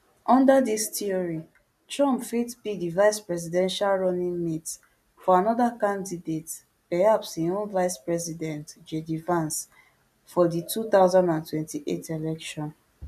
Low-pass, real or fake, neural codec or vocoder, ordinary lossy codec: 14.4 kHz; real; none; none